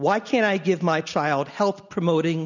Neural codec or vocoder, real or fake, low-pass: none; real; 7.2 kHz